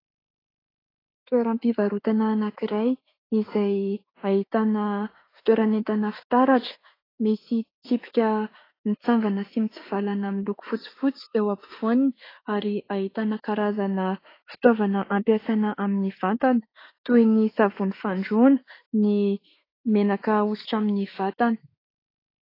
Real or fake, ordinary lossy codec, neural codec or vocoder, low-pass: fake; AAC, 24 kbps; autoencoder, 48 kHz, 32 numbers a frame, DAC-VAE, trained on Japanese speech; 5.4 kHz